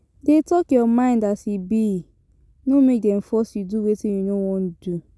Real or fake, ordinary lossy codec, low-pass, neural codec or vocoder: real; none; none; none